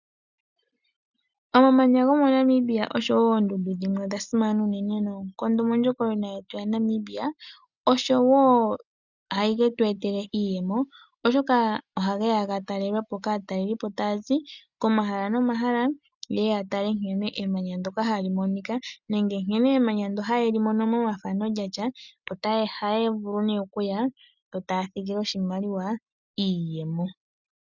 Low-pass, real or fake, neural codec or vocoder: 7.2 kHz; real; none